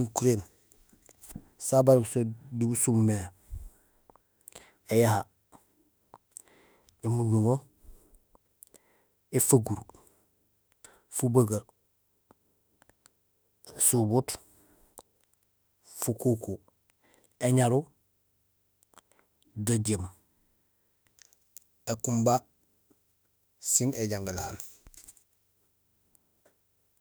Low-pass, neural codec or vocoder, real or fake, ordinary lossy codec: none; autoencoder, 48 kHz, 32 numbers a frame, DAC-VAE, trained on Japanese speech; fake; none